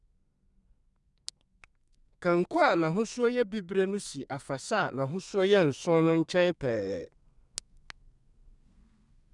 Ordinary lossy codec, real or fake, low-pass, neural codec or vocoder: none; fake; 10.8 kHz; codec, 32 kHz, 1.9 kbps, SNAC